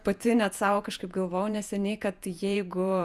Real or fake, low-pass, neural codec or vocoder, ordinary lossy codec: fake; 14.4 kHz; vocoder, 48 kHz, 128 mel bands, Vocos; Opus, 64 kbps